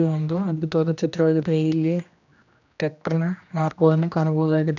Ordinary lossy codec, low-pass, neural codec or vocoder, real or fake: none; 7.2 kHz; codec, 16 kHz, 1 kbps, X-Codec, HuBERT features, trained on general audio; fake